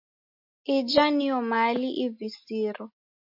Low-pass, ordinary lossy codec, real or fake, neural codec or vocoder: 5.4 kHz; MP3, 32 kbps; real; none